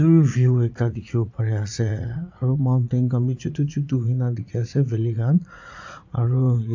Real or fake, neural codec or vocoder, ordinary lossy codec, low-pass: fake; vocoder, 44.1 kHz, 80 mel bands, Vocos; AAC, 48 kbps; 7.2 kHz